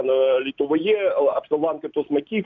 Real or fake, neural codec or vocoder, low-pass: real; none; 7.2 kHz